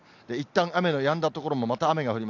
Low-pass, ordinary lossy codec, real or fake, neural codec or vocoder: 7.2 kHz; AAC, 48 kbps; real; none